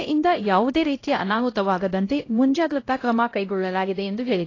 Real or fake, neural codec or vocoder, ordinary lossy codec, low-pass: fake; codec, 16 kHz, 0.5 kbps, X-Codec, HuBERT features, trained on LibriSpeech; AAC, 32 kbps; 7.2 kHz